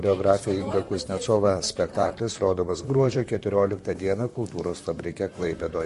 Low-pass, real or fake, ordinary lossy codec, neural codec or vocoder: 14.4 kHz; fake; MP3, 48 kbps; vocoder, 44.1 kHz, 128 mel bands, Pupu-Vocoder